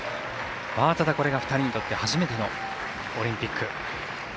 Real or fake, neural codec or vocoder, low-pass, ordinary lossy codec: real; none; none; none